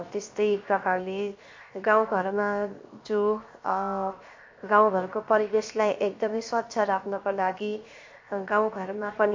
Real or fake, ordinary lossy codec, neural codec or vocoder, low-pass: fake; MP3, 48 kbps; codec, 16 kHz, 0.7 kbps, FocalCodec; 7.2 kHz